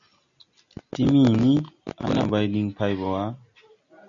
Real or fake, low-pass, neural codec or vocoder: real; 7.2 kHz; none